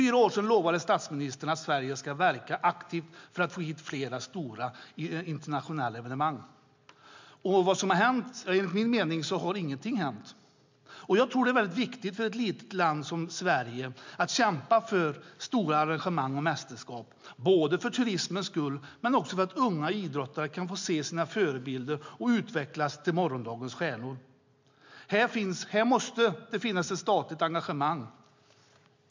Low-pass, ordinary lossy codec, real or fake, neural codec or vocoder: 7.2 kHz; MP3, 64 kbps; real; none